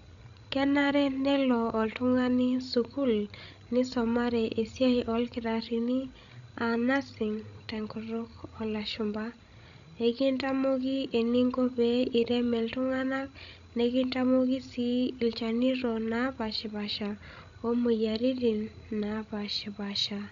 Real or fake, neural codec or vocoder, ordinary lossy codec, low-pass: fake; codec, 16 kHz, 16 kbps, FreqCodec, larger model; none; 7.2 kHz